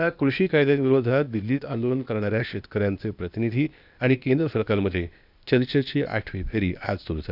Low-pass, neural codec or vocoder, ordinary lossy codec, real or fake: 5.4 kHz; codec, 16 kHz, 0.8 kbps, ZipCodec; none; fake